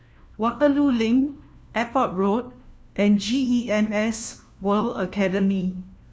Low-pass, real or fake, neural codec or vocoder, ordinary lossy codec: none; fake; codec, 16 kHz, 1 kbps, FunCodec, trained on LibriTTS, 50 frames a second; none